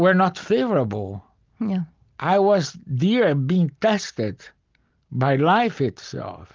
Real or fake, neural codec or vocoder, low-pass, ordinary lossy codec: real; none; 7.2 kHz; Opus, 32 kbps